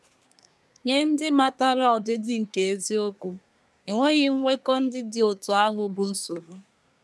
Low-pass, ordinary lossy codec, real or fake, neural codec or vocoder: none; none; fake; codec, 24 kHz, 1 kbps, SNAC